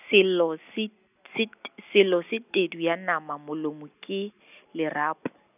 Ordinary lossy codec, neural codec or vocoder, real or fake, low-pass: none; none; real; 3.6 kHz